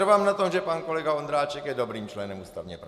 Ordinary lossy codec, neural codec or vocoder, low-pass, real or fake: MP3, 96 kbps; none; 14.4 kHz; real